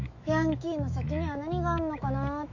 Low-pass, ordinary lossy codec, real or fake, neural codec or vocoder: 7.2 kHz; none; fake; autoencoder, 48 kHz, 128 numbers a frame, DAC-VAE, trained on Japanese speech